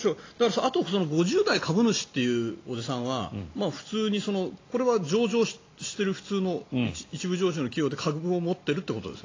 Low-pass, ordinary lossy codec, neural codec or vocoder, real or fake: 7.2 kHz; AAC, 32 kbps; none; real